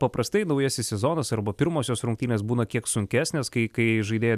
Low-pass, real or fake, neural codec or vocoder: 14.4 kHz; real; none